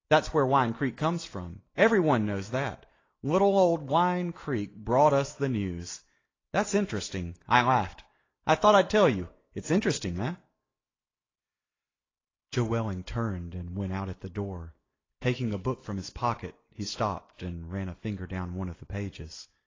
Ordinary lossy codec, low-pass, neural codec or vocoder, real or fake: AAC, 32 kbps; 7.2 kHz; none; real